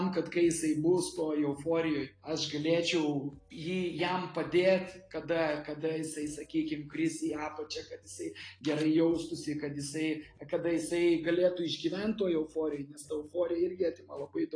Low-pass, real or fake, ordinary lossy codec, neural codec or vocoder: 9.9 kHz; real; AAC, 32 kbps; none